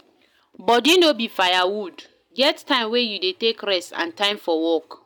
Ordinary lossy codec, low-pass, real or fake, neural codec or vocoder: none; none; real; none